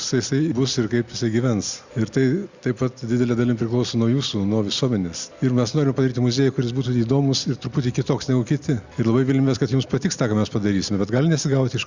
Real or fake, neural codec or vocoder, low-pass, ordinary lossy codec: real; none; 7.2 kHz; Opus, 64 kbps